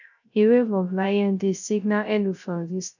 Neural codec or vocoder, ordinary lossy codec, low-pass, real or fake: codec, 16 kHz, 0.3 kbps, FocalCodec; none; 7.2 kHz; fake